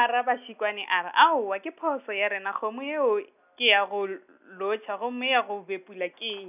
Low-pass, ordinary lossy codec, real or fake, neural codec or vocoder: 3.6 kHz; none; real; none